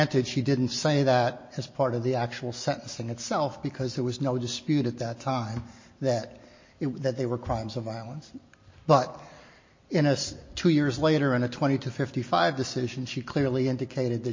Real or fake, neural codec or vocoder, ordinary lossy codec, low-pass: real; none; MP3, 32 kbps; 7.2 kHz